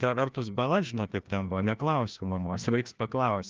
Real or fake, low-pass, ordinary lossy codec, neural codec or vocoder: fake; 7.2 kHz; Opus, 24 kbps; codec, 16 kHz, 1 kbps, FreqCodec, larger model